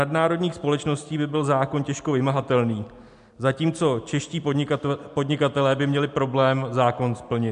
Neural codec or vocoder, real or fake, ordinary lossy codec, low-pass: none; real; MP3, 64 kbps; 10.8 kHz